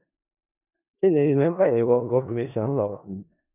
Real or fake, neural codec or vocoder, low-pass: fake; codec, 16 kHz in and 24 kHz out, 0.4 kbps, LongCat-Audio-Codec, four codebook decoder; 3.6 kHz